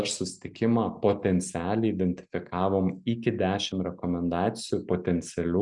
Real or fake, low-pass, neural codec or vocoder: real; 10.8 kHz; none